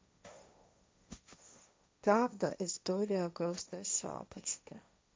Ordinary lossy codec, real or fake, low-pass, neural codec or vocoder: none; fake; none; codec, 16 kHz, 1.1 kbps, Voila-Tokenizer